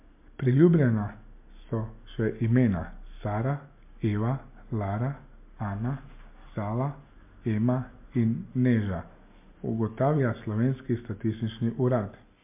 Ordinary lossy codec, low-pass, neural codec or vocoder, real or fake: MP3, 32 kbps; 3.6 kHz; none; real